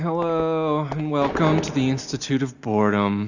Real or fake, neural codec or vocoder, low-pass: real; none; 7.2 kHz